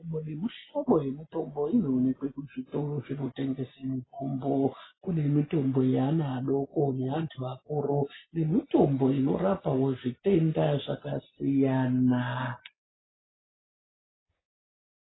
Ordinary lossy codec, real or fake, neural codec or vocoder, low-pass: AAC, 16 kbps; real; none; 7.2 kHz